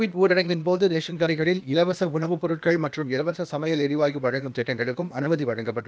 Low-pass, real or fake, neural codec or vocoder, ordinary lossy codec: none; fake; codec, 16 kHz, 0.8 kbps, ZipCodec; none